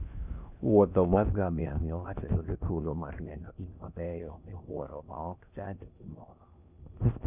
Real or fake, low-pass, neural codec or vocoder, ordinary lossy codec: fake; 3.6 kHz; codec, 16 kHz in and 24 kHz out, 0.6 kbps, FocalCodec, streaming, 4096 codes; none